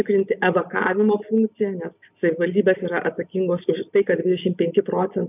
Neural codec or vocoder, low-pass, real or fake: none; 3.6 kHz; real